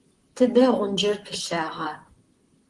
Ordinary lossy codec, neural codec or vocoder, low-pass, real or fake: Opus, 24 kbps; vocoder, 44.1 kHz, 128 mel bands, Pupu-Vocoder; 10.8 kHz; fake